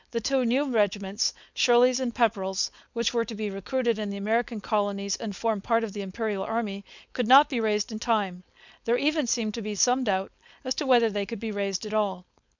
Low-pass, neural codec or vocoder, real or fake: 7.2 kHz; codec, 16 kHz, 4.8 kbps, FACodec; fake